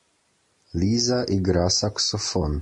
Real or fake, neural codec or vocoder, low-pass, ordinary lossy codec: real; none; 10.8 kHz; MP3, 48 kbps